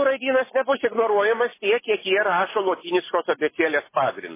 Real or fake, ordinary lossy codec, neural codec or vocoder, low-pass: real; MP3, 16 kbps; none; 3.6 kHz